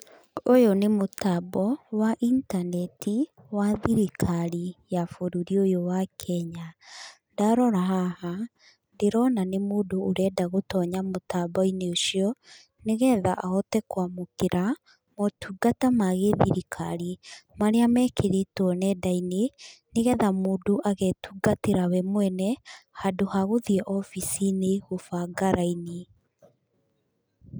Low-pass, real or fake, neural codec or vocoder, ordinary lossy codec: none; real; none; none